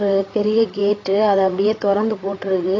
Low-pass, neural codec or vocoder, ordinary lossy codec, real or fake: 7.2 kHz; codec, 16 kHz, 8 kbps, FreqCodec, larger model; AAC, 32 kbps; fake